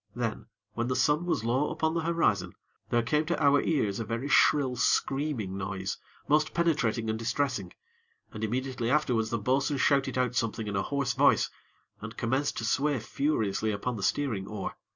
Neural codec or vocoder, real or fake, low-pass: none; real; 7.2 kHz